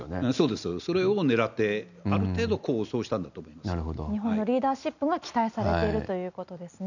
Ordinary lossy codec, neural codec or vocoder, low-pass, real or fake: none; none; 7.2 kHz; real